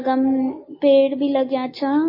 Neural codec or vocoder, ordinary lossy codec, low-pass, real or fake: none; MP3, 24 kbps; 5.4 kHz; real